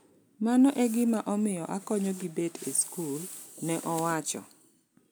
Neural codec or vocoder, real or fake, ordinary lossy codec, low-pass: none; real; none; none